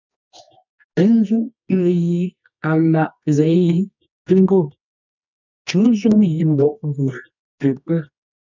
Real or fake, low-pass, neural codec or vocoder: fake; 7.2 kHz; codec, 24 kHz, 0.9 kbps, WavTokenizer, medium music audio release